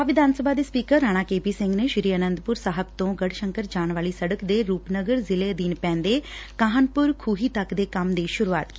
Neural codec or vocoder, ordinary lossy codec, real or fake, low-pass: none; none; real; none